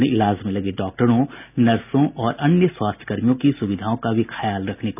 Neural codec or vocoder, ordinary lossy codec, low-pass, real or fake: none; none; 3.6 kHz; real